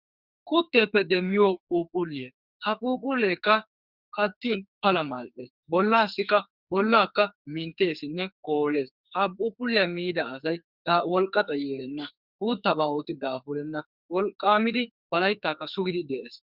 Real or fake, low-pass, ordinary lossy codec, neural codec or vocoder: fake; 5.4 kHz; Opus, 64 kbps; codec, 44.1 kHz, 2.6 kbps, SNAC